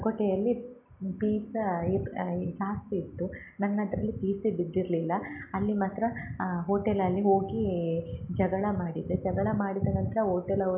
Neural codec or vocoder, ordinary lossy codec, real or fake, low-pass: none; none; real; 3.6 kHz